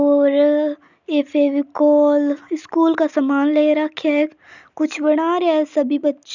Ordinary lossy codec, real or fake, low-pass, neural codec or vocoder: none; real; 7.2 kHz; none